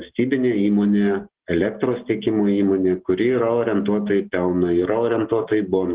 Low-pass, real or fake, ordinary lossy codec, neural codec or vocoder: 3.6 kHz; real; Opus, 16 kbps; none